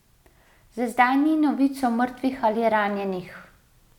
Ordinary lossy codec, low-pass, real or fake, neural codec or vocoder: none; 19.8 kHz; real; none